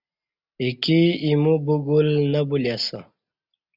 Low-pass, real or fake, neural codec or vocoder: 5.4 kHz; real; none